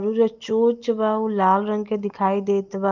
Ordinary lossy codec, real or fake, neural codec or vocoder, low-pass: Opus, 32 kbps; real; none; 7.2 kHz